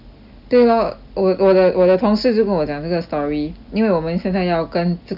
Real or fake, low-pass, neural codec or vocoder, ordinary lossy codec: real; 5.4 kHz; none; none